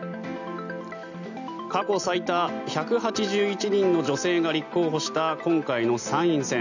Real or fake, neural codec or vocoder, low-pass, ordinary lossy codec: real; none; 7.2 kHz; none